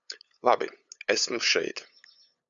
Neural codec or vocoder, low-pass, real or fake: codec, 16 kHz, 8 kbps, FunCodec, trained on LibriTTS, 25 frames a second; 7.2 kHz; fake